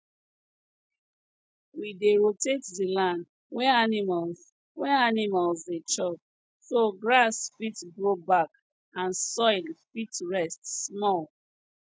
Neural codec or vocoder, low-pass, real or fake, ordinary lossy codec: none; none; real; none